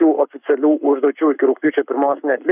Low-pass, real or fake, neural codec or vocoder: 3.6 kHz; fake; codec, 44.1 kHz, 7.8 kbps, Pupu-Codec